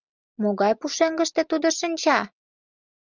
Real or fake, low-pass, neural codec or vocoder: real; 7.2 kHz; none